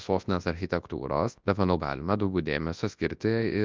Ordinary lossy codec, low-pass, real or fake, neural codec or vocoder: Opus, 24 kbps; 7.2 kHz; fake; codec, 24 kHz, 0.9 kbps, WavTokenizer, large speech release